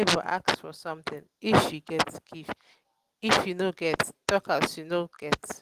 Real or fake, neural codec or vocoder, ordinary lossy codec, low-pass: real; none; Opus, 24 kbps; 14.4 kHz